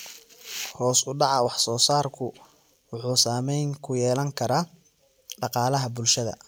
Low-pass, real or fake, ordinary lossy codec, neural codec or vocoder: none; real; none; none